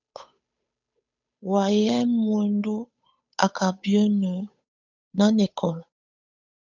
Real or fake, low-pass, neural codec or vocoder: fake; 7.2 kHz; codec, 16 kHz, 8 kbps, FunCodec, trained on Chinese and English, 25 frames a second